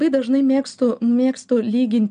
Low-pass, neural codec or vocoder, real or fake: 9.9 kHz; none; real